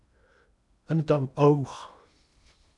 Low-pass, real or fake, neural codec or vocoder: 10.8 kHz; fake; codec, 16 kHz in and 24 kHz out, 0.8 kbps, FocalCodec, streaming, 65536 codes